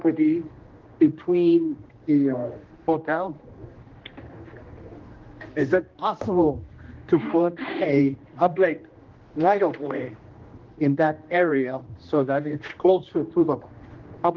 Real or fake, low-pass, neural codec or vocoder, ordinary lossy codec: fake; 7.2 kHz; codec, 16 kHz, 1 kbps, X-Codec, HuBERT features, trained on general audio; Opus, 16 kbps